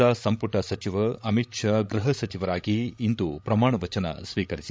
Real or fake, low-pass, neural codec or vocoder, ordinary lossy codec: fake; none; codec, 16 kHz, 16 kbps, FreqCodec, larger model; none